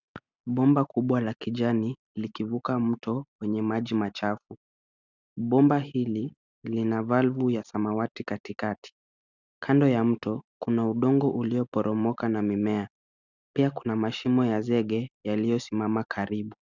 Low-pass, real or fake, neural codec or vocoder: 7.2 kHz; real; none